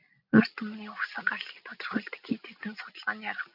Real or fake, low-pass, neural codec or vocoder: real; 5.4 kHz; none